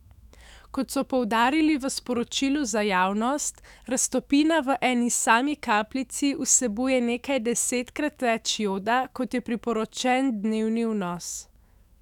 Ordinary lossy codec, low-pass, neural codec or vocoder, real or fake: none; 19.8 kHz; autoencoder, 48 kHz, 128 numbers a frame, DAC-VAE, trained on Japanese speech; fake